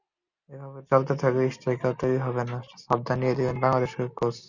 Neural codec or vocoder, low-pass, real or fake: none; 7.2 kHz; real